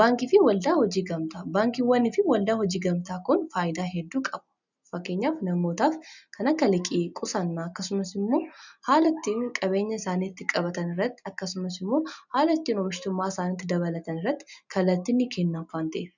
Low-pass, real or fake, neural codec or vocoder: 7.2 kHz; real; none